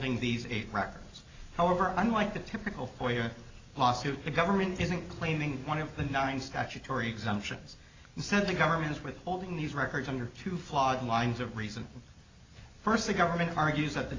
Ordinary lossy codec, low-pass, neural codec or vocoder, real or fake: AAC, 32 kbps; 7.2 kHz; none; real